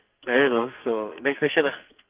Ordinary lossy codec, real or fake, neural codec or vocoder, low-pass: Opus, 32 kbps; fake; codec, 44.1 kHz, 2.6 kbps, SNAC; 3.6 kHz